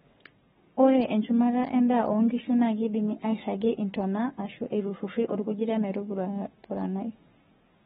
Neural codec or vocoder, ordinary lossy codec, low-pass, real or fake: codec, 44.1 kHz, 7.8 kbps, Pupu-Codec; AAC, 16 kbps; 19.8 kHz; fake